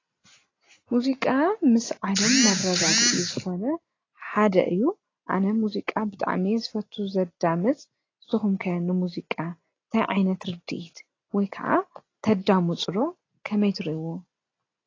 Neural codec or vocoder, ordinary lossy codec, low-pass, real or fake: none; AAC, 32 kbps; 7.2 kHz; real